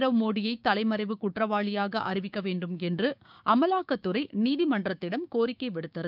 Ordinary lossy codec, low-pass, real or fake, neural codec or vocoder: none; 5.4 kHz; fake; codec, 16 kHz, 6 kbps, DAC